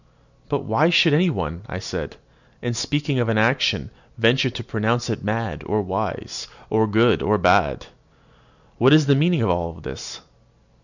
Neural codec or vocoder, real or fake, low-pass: none; real; 7.2 kHz